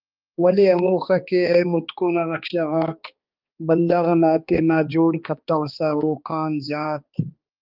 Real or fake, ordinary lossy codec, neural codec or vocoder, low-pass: fake; Opus, 24 kbps; codec, 16 kHz, 2 kbps, X-Codec, HuBERT features, trained on balanced general audio; 5.4 kHz